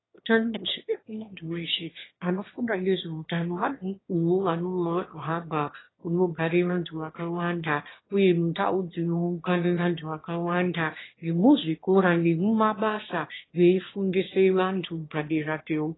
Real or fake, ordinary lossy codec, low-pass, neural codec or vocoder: fake; AAC, 16 kbps; 7.2 kHz; autoencoder, 22.05 kHz, a latent of 192 numbers a frame, VITS, trained on one speaker